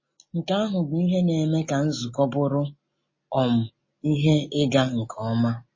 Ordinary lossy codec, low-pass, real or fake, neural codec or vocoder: MP3, 32 kbps; 7.2 kHz; real; none